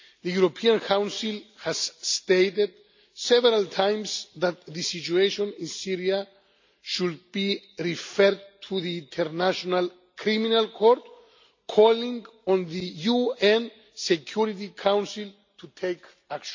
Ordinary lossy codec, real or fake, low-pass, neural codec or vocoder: MP3, 64 kbps; real; 7.2 kHz; none